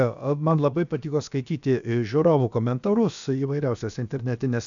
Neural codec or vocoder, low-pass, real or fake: codec, 16 kHz, about 1 kbps, DyCAST, with the encoder's durations; 7.2 kHz; fake